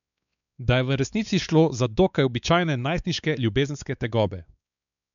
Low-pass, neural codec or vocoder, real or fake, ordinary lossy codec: 7.2 kHz; codec, 16 kHz, 4 kbps, X-Codec, WavLM features, trained on Multilingual LibriSpeech; fake; none